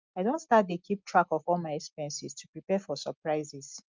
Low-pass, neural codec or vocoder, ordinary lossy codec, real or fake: 7.2 kHz; none; Opus, 24 kbps; real